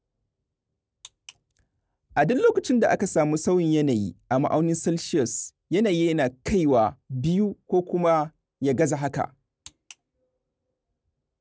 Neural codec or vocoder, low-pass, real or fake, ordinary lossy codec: none; none; real; none